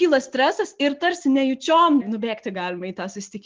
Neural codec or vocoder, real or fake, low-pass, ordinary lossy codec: none; real; 7.2 kHz; Opus, 32 kbps